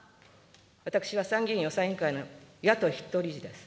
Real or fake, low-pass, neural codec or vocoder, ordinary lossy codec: real; none; none; none